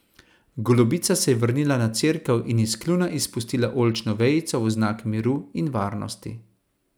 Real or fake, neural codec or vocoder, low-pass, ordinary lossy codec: real; none; none; none